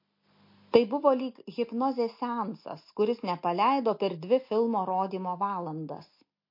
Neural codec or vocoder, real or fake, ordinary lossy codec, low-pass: none; real; MP3, 32 kbps; 5.4 kHz